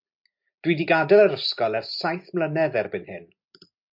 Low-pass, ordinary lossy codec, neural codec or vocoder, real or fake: 5.4 kHz; MP3, 48 kbps; none; real